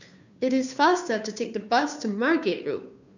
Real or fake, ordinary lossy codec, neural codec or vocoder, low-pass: fake; none; codec, 16 kHz, 2 kbps, FunCodec, trained on Chinese and English, 25 frames a second; 7.2 kHz